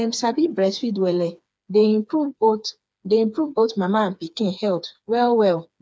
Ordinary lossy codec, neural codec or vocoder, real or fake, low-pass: none; codec, 16 kHz, 4 kbps, FreqCodec, smaller model; fake; none